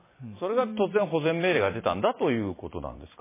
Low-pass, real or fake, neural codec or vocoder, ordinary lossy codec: 3.6 kHz; fake; vocoder, 44.1 kHz, 128 mel bands every 256 samples, BigVGAN v2; MP3, 16 kbps